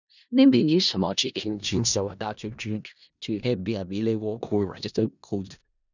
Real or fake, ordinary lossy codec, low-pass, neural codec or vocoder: fake; none; 7.2 kHz; codec, 16 kHz in and 24 kHz out, 0.4 kbps, LongCat-Audio-Codec, four codebook decoder